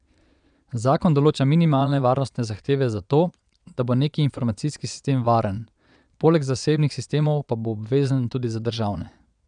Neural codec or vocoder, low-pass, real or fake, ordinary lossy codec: vocoder, 22.05 kHz, 80 mel bands, Vocos; 9.9 kHz; fake; none